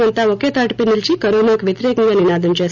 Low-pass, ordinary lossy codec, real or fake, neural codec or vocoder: 7.2 kHz; none; real; none